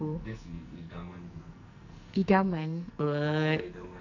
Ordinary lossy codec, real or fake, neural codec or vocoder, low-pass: none; fake; codec, 44.1 kHz, 2.6 kbps, SNAC; 7.2 kHz